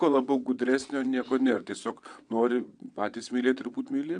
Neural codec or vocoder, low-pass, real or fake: vocoder, 22.05 kHz, 80 mel bands, WaveNeXt; 9.9 kHz; fake